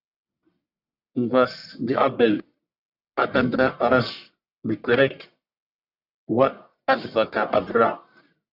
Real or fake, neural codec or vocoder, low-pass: fake; codec, 44.1 kHz, 1.7 kbps, Pupu-Codec; 5.4 kHz